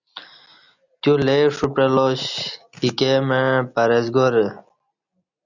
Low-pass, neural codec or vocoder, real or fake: 7.2 kHz; none; real